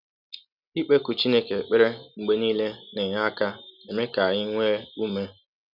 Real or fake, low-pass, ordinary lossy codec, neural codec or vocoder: real; 5.4 kHz; none; none